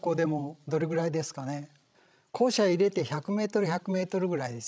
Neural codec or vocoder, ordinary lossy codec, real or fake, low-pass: codec, 16 kHz, 16 kbps, FreqCodec, larger model; none; fake; none